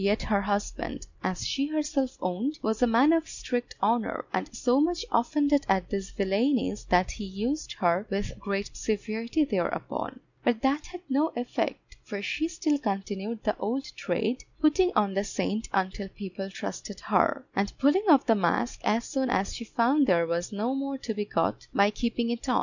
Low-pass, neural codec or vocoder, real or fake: 7.2 kHz; none; real